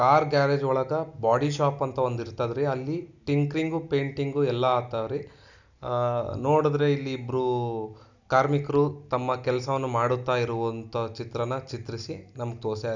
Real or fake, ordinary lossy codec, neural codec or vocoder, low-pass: real; none; none; 7.2 kHz